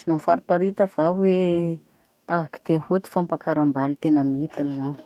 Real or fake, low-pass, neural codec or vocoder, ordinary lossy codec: fake; 19.8 kHz; codec, 44.1 kHz, 2.6 kbps, DAC; none